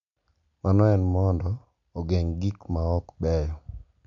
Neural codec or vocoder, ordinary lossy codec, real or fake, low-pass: none; MP3, 64 kbps; real; 7.2 kHz